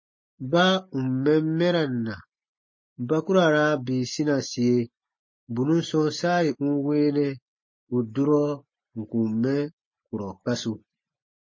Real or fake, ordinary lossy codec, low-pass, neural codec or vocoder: real; MP3, 32 kbps; 7.2 kHz; none